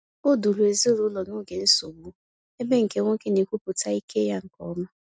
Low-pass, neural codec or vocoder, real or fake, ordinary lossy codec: none; none; real; none